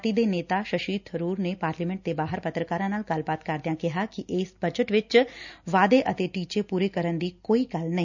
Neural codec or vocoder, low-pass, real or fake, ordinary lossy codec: none; 7.2 kHz; real; none